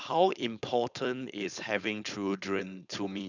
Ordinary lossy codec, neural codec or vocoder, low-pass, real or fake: none; codec, 16 kHz, 4.8 kbps, FACodec; 7.2 kHz; fake